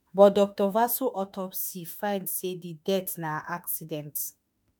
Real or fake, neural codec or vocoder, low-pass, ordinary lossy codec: fake; autoencoder, 48 kHz, 32 numbers a frame, DAC-VAE, trained on Japanese speech; 19.8 kHz; none